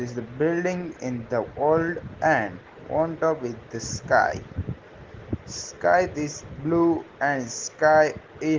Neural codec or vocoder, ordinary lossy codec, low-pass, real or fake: none; Opus, 16 kbps; 7.2 kHz; real